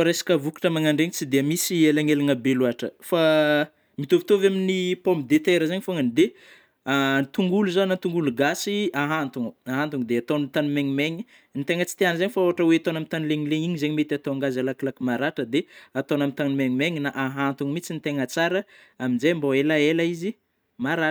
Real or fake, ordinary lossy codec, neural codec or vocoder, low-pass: real; none; none; none